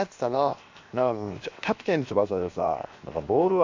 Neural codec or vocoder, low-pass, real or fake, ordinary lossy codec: codec, 16 kHz, 0.7 kbps, FocalCodec; 7.2 kHz; fake; MP3, 48 kbps